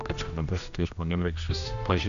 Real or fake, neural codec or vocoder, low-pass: fake; codec, 16 kHz, 1 kbps, X-Codec, HuBERT features, trained on general audio; 7.2 kHz